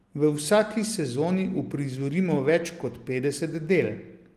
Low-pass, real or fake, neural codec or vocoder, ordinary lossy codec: 14.4 kHz; real; none; Opus, 24 kbps